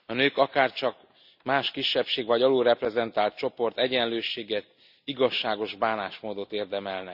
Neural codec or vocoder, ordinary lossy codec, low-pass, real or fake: none; none; 5.4 kHz; real